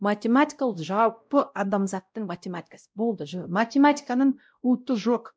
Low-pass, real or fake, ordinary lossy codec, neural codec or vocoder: none; fake; none; codec, 16 kHz, 0.5 kbps, X-Codec, WavLM features, trained on Multilingual LibriSpeech